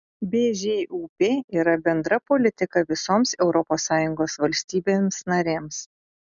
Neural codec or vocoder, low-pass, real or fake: none; 7.2 kHz; real